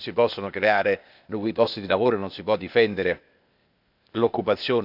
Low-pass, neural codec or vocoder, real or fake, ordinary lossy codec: 5.4 kHz; codec, 16 kHz, 0.8 kbps, ZipCodec; fake; none